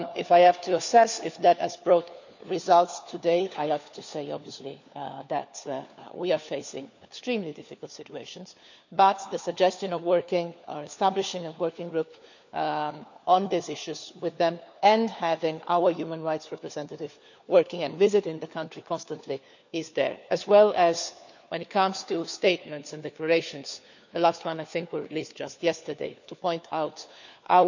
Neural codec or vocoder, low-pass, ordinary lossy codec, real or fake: codec, 16 kHz, 4 kbps, FunCodec, trained on LibriTTS, 50 frames a second; 7.2 kHz; none; fake